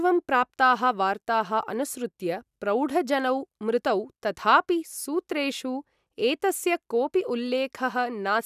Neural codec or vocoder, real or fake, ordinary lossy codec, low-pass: none; real; none; 14.4 kHz